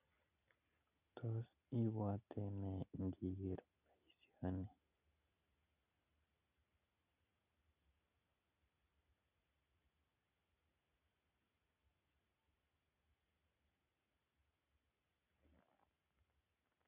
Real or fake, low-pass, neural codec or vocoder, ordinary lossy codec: real; 3.6 kHz; none; none